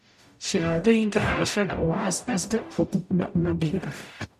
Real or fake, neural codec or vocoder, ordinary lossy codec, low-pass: fake; codec, 44.1 kHz, 0.9 kbps, DAC; none; 14.4 kHz